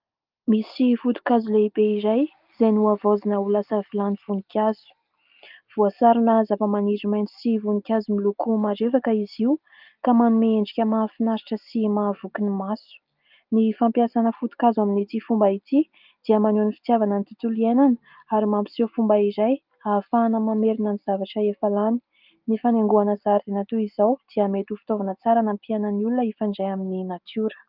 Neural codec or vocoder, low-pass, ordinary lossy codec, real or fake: none; 5.4 kHz; Opus, 32 kbps; real